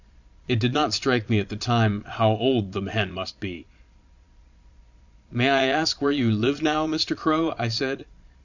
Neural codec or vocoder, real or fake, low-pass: vocoder, 22.05 kHz, 80 mel bands, Vocos; fake; 7.2 kHz